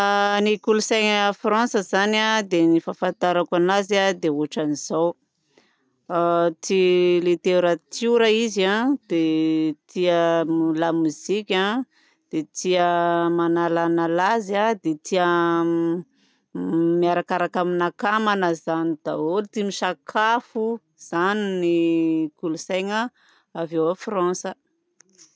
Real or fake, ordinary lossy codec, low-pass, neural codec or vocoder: real; none; none; none